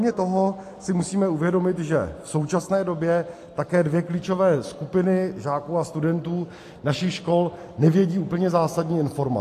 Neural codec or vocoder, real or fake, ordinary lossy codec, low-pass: none; real; AAC, 64 kbps; 14.4 kHz